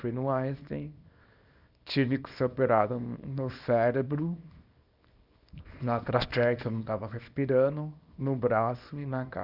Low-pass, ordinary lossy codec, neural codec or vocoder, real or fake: 5.4 kHz; none; codec, 24 kHz, 0.9 kbps, WavTokenizer, small release; fake